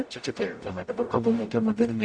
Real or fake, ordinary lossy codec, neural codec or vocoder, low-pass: fake; AAC, 64 kbps; codec, 44.1 kHz, 0.9 kbps, DAC; 9.9 kHz